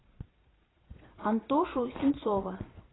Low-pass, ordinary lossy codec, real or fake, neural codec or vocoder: 7.2 kHz; AAC, 16 kbps; fake; vocoder, 22.05 kHz, 80 mel bands, Vocos